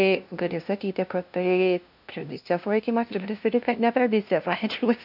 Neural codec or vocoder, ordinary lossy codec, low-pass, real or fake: codec, 16 kHz, 0.5 kbps, FunCodec, trained on LibriTTS, 25 frames a second; AAC, 48 kbps; 5.4 kHz; fake